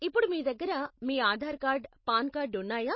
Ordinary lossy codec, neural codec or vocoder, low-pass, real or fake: MP3, 24 kbps; none; 7.2 kHz; real